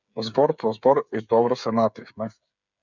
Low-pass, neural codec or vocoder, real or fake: 7.2 kHz; codec, 16 kHz, 8 kbps, FreqCodec, smaller model; fake